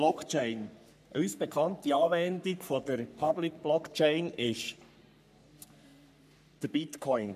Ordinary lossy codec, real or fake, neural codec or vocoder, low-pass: none; fake; codec, 44.1 kHz, 3.4 kbps, Pupu-Codec; 14.4 kHz